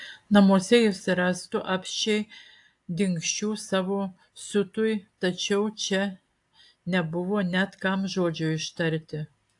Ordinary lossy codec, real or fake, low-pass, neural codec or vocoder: AAC, 64 kbps; real; 10.8 kHz; none